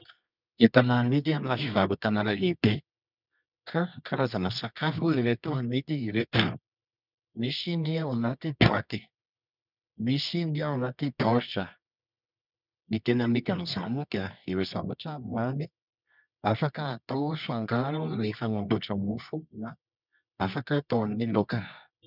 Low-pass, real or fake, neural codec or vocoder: 5.4 kHz; fake; codec, 24 kHz, 0.9 kbps, WavTokenizer, medium music audio release